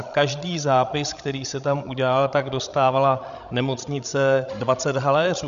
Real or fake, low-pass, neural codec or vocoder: fake; 7.2 kHz; codec, 16 kHz, 16 kbps, FreqCodec, larger model